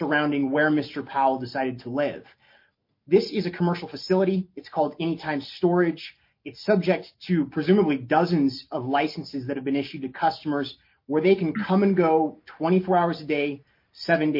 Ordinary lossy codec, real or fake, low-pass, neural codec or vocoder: MP3, 32 kbps; real; 5.4 kHz; none